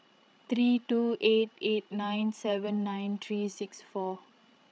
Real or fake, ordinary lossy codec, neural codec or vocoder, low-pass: fake; none; codec, 16 kHz, 16 kbps, FreqCodec, larger model; none